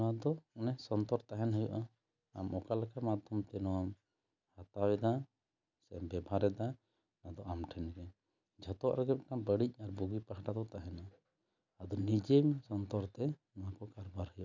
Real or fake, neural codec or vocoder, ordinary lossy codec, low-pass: real; none; none; 7.2 kHz